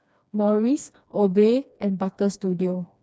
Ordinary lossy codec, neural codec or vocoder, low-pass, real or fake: none; codec, 16 kHz, 2 kbps, FreqCodec, smaller model; none; fake